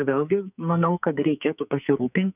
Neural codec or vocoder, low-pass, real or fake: codec, 44.1 kHz, 2.6 kbps, SNAC; 3.6 kHz; fake